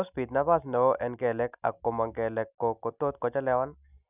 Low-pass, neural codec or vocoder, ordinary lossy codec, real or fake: 3.6 kHz; none; none; real